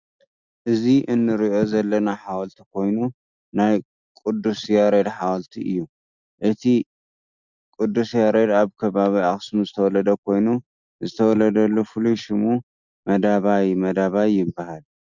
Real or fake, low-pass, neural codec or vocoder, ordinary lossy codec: real; 7.2 kHz; none; Opus, 64 kbps